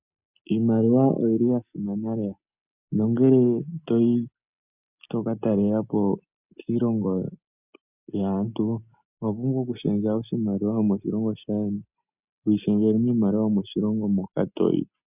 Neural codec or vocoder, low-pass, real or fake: none; 3.6 kHz; real